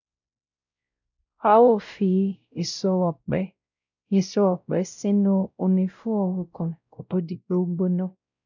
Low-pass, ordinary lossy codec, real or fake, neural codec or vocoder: 7.2 kHz; none; fake; codec, 16 kHz, 0.5 kbps, X-Codec, WavLM features, trained on Multilingual LibriSpeech